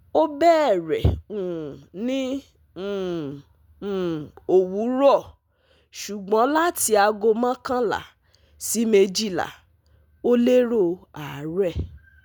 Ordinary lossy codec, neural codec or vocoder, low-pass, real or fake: none; none; none; real